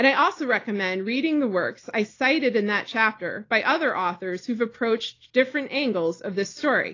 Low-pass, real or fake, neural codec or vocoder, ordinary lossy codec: 7.2 kHz; real; none; AAC, 32 kbps